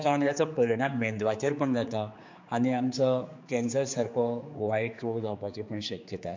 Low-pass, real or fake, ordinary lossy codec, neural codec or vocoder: 7.2 kHz; fake; MP3, 48 kbps; codec, 16 kHz, 4 kbps, X-Codec, HuBERT features, trained on general audio